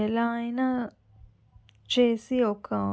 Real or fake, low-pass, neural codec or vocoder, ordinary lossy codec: real; none; none; none